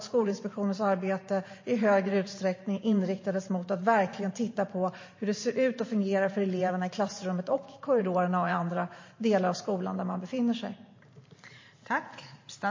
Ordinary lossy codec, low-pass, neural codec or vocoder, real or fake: MP3, 32 kbps; 7.2 kHz; vocoder, 44.1 kHz, 128 mel bands every 512 samples, BigVGAN v2; fake